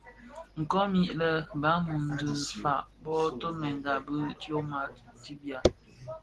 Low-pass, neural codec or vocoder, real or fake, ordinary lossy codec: 10.8 kHz; none; real; Opus, 16 kbps